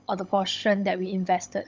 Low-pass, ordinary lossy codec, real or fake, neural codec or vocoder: 7.2 kHz; Opus, 32 kbps; fake; codec, 16 kHz, 16 kbps, FunCodec, trained on Chinese and English, 50 frames a second